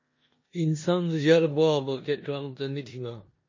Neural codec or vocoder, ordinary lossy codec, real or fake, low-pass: codec, 16 kHz in and 24 kHz out, 0.9 kbps, LongCat-Audio-Codec, four codebook decoder; MP3, 32 kbps; fake; 7.2 kHz